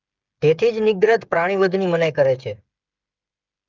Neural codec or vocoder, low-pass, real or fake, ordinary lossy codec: codec, 16 kHz, 8 kbps, FreqCodec, smaller model; 7.2 kHz; fake; Opus, 24 kbps